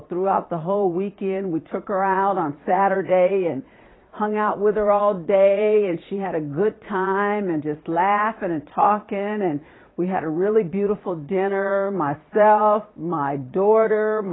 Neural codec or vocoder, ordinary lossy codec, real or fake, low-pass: vocoder, 22.05 kHz, 80 mel bands, Vocos; AAC, 16 kbps; fake; 7.2 kHz